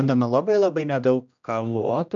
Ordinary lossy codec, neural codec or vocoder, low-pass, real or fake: MP3, 64 kbps; codec, 16 kHz, 1 kbps, X-Codec, HuBERT features, trained on general audio; 7.2 kHz; fake